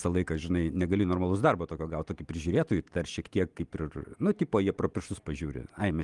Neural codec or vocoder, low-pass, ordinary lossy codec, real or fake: none; 10.8 kHz; Opus, 24 kbps; real